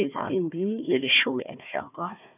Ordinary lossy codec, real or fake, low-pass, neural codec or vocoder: none; fake; 3.6 kHz; codec, 16 kHz, 1 kbps, FunCodec, trained on Chinese and English, 50 frames a second